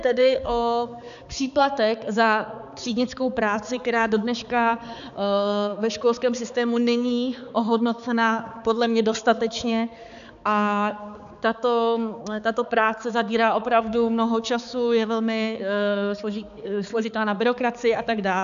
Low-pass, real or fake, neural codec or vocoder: 7.2 kHz; fake; codec, 16 kHz, 4 kbps, X-Codec, HuBERT features, trained on balanced general audio